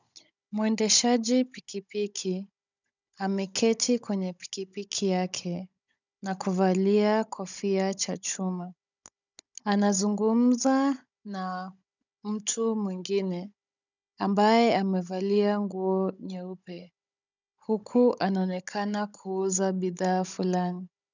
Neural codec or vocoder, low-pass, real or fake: codec, 16 kHz, 16 kbps, FunCodec, trained on Chinese and English, 50 frames a second; 7.2 kHz; fake